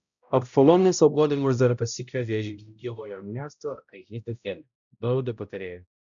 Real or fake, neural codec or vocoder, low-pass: fake; codec, 16 kHz, 0.5 kbps, X-Codec, HuBERT features, trained on balanced general audio; 7.2 kHz